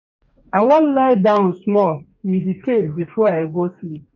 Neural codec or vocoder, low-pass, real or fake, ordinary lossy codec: codec, 44.1 kHz, 2.6 kbps, DAC; 7.2 kHz; fake; none